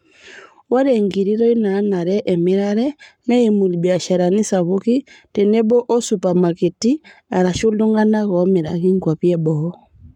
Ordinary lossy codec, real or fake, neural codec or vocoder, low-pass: none; fake; codec, 44.1 kHz, 7.8 kbps, Pupu-Codec; 19.8 kHz